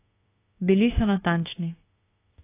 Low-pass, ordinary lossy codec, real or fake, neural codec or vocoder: 3.6 kHz; AAC, 16 kbps; fake; autoencoder, 48 kHz, 32 numbers a frame, DAC-VAE, trained on Japanese speech